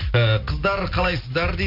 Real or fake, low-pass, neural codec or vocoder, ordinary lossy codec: real; 5.4 kHz; none; none